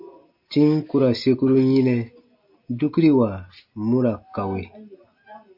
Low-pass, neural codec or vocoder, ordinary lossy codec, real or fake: 5.4 kHz; none; AAC, 48 kbps; real